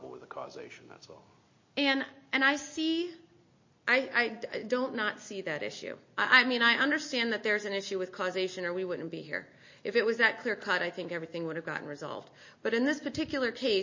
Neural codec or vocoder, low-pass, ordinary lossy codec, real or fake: none; 7.2 kHz; MP3, 32 kbps; real